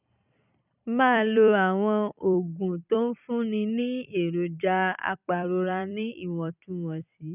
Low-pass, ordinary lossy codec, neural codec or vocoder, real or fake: 3.6 kHz; none; vocoder, 44.1 kHz, 128 mel bands every 256 samples, BigVGAN v2; fake